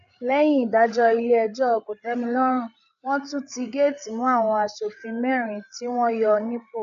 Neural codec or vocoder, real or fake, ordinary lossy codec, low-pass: codec, 16 kHz, 8 kbps, FreqCodec, larger model; fake; none; 7.2 kHz